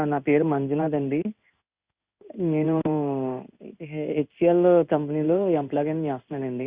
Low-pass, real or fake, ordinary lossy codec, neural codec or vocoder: 3.6 kHz; fake; Opus, 64 kbps; codec, 16 kHz in and 24 kHz out, 1 kbps, XY-Tokenizer